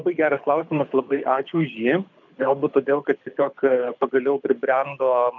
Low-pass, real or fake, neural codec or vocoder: 7.2 kHz; fake; codec, 24 kHz, 6 kbps, HILCodec